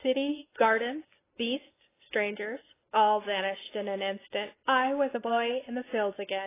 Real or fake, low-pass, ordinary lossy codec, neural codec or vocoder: real; 3.6 kHz; AAC, 16 kbps; none